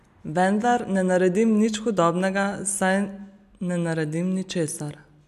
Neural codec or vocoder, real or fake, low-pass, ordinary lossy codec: none; real; 14.4 kHz; none